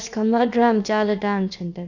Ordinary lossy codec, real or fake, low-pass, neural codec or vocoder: none; fake; 7.2 kHz; codec, 16 kHz, about 1 kbps, DyCAST, with the encoder's durations